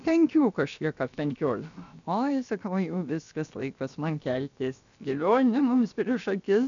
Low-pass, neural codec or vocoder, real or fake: 7.2 kHz; codec, 16 kHz, about 1 kbps, DyCAST, with the encoder's durations; fake